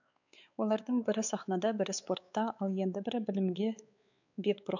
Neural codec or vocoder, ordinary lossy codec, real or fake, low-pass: codec, 16 kHz, 4 kbps, X-Codec, WavLM features, trained on Multilingual LibriSpeech; none; fake; 7.2 kHz